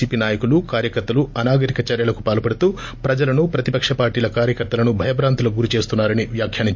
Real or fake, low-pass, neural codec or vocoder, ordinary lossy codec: real; 7.2 kHz; none; MP3, 48 kbps